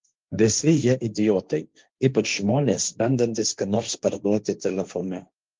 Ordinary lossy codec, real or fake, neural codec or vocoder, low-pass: Opus, 32 kbps; fake; codec, 16 kHz, 1.1 kbps, Voila-Tokenizer; 7.2 kHz